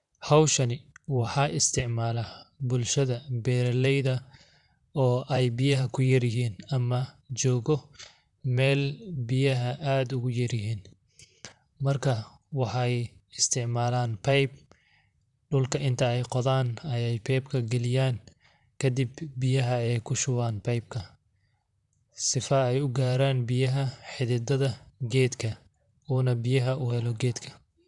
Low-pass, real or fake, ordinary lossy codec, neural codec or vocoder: 10.8 kHz; fake; none; vocoder, 44.1 kHz, 128 mel bands, Pupu-Vocoder